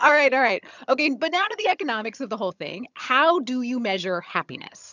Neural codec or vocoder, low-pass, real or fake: vocoder, 22.05 kHz, 80 mel bands, HiFi-GAN; 7.2 kHz; fake